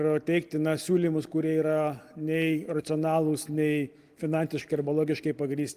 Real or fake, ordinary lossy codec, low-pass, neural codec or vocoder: real; Opus, 24 kbps; 14.4 kHz; none